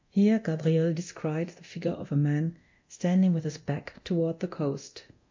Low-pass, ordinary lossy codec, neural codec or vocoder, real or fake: 7.2 kHz; MP3, 48 kbps; codec, 24 kHz, 0.9 kbps, DualCodec; fake